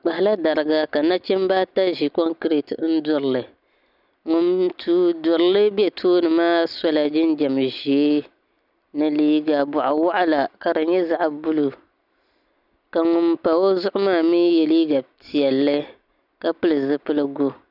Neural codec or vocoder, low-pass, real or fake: none; 5.4 kHz; real